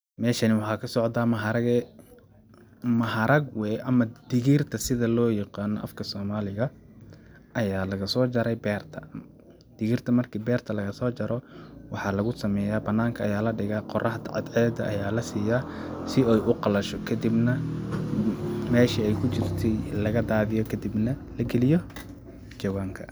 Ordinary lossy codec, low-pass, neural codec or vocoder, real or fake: none; none; none; real